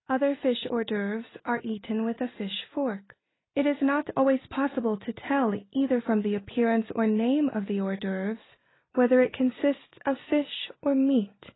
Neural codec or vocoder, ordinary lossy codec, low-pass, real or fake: none; AAC, 16 kbps; 7.2 kHz; real